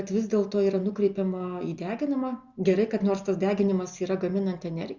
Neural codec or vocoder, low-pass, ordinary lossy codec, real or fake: none; 7.2 kHz; Opus, 64 kbps; real